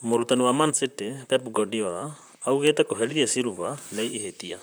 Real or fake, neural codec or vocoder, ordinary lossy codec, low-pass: fake; vocoder, 44.1 kHz, 128 mel bands, Pupu-Vocoder; none; none